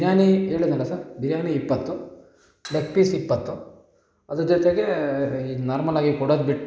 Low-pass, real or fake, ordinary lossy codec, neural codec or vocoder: none; real; none; none